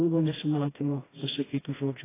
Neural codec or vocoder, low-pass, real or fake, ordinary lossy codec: codec, 16 kHz, 1 kbps, FreqCodec, smaller model; 3.6 kHz; fake; AAC, 16 kbps